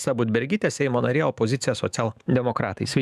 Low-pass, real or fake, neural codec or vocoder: 14.4 kHz; fake; autoencoder, 48 kHz, 128 numbers a frame, DAC-VAE, trained on Japanese speech